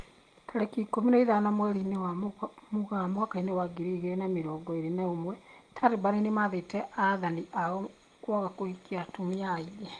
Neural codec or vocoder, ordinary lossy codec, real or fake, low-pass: none; Opus, 24 kbps; real; 9.9 kHz